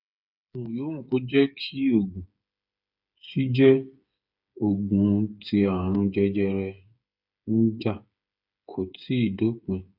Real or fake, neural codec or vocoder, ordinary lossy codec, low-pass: fake; codec, 16 kHz, 8 kbps, FreqCodec, smaller model; none; 5.4 kHz